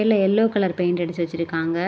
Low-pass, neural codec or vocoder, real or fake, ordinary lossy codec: none; none; real; none